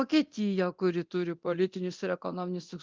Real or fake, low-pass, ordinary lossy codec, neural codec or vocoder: fake; 7.2 kHz; Opus, 32 kbps; codec, 24 kHz, 0.9 kbps, DualCodec